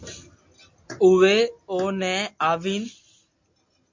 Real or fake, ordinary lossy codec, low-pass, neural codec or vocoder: real; MP3, 48 kbps; 7.2 kHz; none